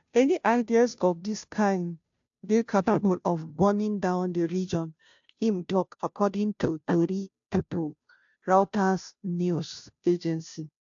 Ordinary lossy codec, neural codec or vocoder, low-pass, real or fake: AAC, 64 kbps; codec, 16 kHz, 0.5 kbps, FunCodec, trained on Chinese and English, 25 frames a second; 7.2 kHz; fake